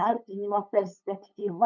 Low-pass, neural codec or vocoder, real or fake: 7.2 kHz; codec, 16 kHz, 8 kbps, FunCodec, trained on LibriTTS, 25 frames a second; fake